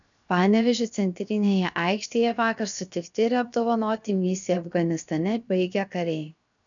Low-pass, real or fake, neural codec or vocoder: 7.2 kHz; fake; codec, 16 kHz, 0.7 kbps, FocalCodec